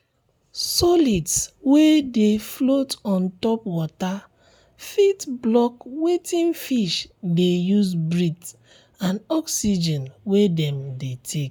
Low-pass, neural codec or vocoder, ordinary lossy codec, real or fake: none; none; none; real